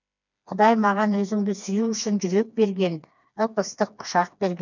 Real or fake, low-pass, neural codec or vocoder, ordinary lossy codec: fake; 7.2 kHz; codec, 16 kHz, 2 kbps, FreqCodec, smaller model; none